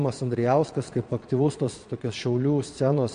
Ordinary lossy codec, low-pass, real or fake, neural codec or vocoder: MP3, 48 kbps; 9.9 kHz; fake; vocoder, 22.05 kHz, 80 mel bands, Vocos